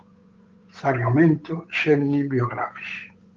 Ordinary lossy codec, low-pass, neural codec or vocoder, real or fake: Opus, 32 kbps; 7.2 kHz; codec, 16 kHz, 8 kbps, FunCodec, trained on Chinese and English, 25 frames a second; fake